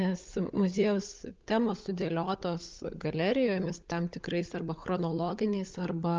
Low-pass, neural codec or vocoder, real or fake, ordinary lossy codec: 7.2 kHz; codec, 16 kHz, 16 kbps, FunCodec, trained on LibriTTS, 50 frames a second; fake; Opus, 24 kbps